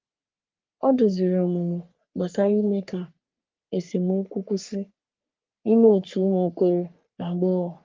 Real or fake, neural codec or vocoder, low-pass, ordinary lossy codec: fake; codec, 44.1 kHz, 3.4 kbps, Pupu-Codec; 7.2 kHz; Opus, 24 kbps